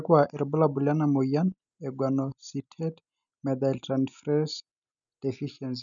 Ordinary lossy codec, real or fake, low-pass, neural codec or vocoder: none; real; 7.2 kHz; none